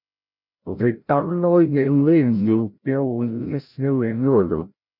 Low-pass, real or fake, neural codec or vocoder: 5.4 kHz; fake; codec, 16 kHz, 0.5 kbps, FreqCodec, larger model